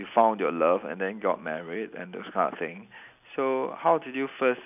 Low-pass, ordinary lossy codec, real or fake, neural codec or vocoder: 3.6 kHz; none; real; none